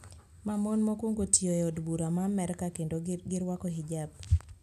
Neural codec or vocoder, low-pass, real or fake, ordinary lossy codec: none; 14.4 kHz; real; none